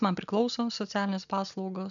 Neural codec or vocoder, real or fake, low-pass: none; real; 7.2 kHz